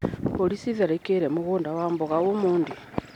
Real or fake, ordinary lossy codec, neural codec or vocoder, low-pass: real; none; none; 19.8 kHz